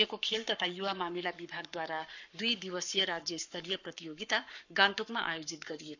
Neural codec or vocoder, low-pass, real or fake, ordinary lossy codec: codec, 44.1 kHz, 7.8 kbps, Pupu-Codec; 7.2 kHz; fake; none